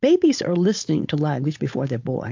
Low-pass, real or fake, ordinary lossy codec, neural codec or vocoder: 7.2 kHz; fake; AAC, 48 kbps; codec, 16 kHz, 4.8 kbps, FACodec